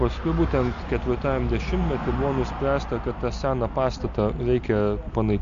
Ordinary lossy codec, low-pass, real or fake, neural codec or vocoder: MP3, 64 kbps; 7.2 kHz; real; none